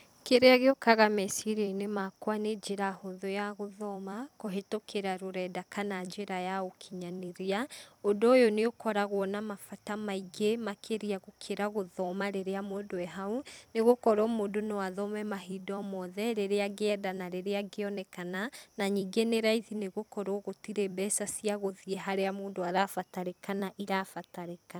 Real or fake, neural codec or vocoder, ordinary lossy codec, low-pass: fake; vocoder, 44.1 kHz, 128 mel bands every 256 samples, BigVGAN v2; none; none